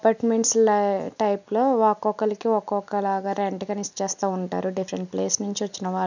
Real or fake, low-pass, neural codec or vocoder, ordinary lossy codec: real; 7.2 kHz; none; none